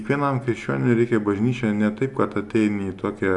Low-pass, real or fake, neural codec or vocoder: 10.8 kHz; real; none